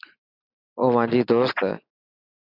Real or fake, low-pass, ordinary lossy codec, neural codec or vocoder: real; 5.4 kHz; MP3, 48 kbps; none